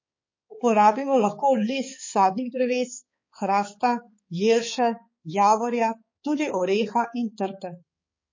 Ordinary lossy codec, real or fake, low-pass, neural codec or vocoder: MP3, 32 kbps; fake; 7.2 kHz; codec, 16 kHz, 4 kbps, X-Codec, HuBERT features, trained on balanced general audio